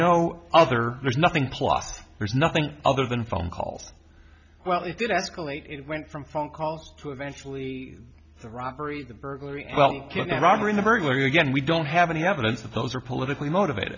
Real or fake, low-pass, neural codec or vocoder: real; 7.2 kHz; none